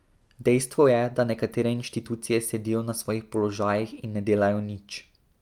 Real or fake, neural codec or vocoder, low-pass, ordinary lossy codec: real; none; 19.8 kHz; Opus, 32 kbps